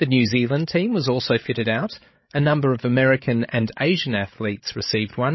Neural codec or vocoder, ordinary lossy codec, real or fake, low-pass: codec, 16 kHz, 16 kbps, FreqCodec, larger model; MP3, 24 kbps; fake; 7.2 kHz